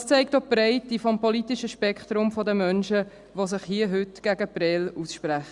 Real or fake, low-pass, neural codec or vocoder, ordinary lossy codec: real; 10.8 kHz; none; Opus, 64 kbps